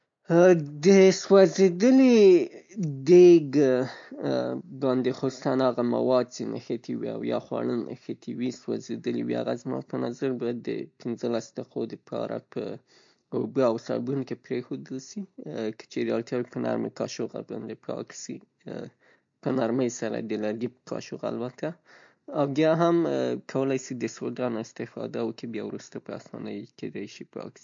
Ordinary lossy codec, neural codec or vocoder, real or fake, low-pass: MP3, 48 kbps; none; real; 7.2 kHz